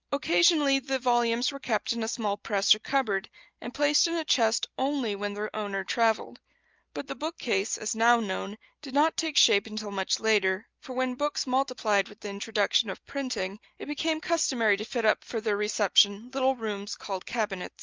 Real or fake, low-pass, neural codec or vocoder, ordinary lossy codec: real; 7.2 kHz; none; Opus, 24 kbps